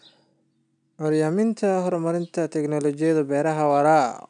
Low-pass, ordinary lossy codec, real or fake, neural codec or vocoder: 9.9 kHz; none; real; none